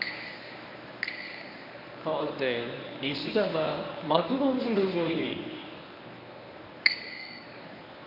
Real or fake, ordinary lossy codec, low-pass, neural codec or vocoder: fake; none; 5.4 kHz; codec, 24 kHz, 0.9 kbps, WavTokenizer, medium speech release version 1